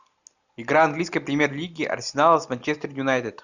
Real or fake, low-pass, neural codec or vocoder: real; 7.2 kHz; none